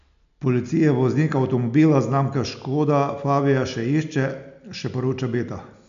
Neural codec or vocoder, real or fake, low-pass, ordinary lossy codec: none; real; 7.2 kHz; none